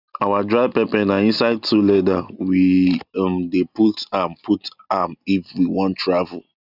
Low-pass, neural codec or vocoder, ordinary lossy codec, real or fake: 5.4 kHz; none; none; real